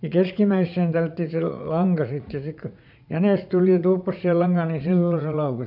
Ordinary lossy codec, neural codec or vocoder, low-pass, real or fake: none; vocoder, 44.1 kHz, 80 mel bands, Vocos; 5.4 kHz; fake